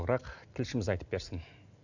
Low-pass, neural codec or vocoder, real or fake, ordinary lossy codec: 7.2 kHz; none; real; none